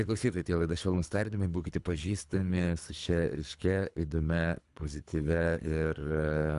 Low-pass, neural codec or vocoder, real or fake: 10.8 kHz; codec, 24 kHz, 3 kbps, HILCodec; fake